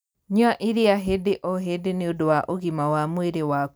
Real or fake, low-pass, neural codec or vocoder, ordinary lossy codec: real; none; none; none